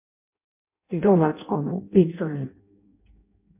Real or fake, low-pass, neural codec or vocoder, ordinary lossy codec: fake; 3.6 kHz; codec, 16 kHz in and 24 kHz out, 0.6 kbps, FireRedTTS-2 codec; MP3, 32 kbps